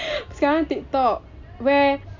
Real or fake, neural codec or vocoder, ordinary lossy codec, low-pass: real; none; MP3, 48 kbps; 7.2 kHz